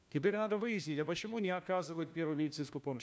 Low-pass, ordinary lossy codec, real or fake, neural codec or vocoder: none; none; fake; codec, 16 kHz, 1 kbps, FunCodec, trained on LibriTTS, 50 frames a second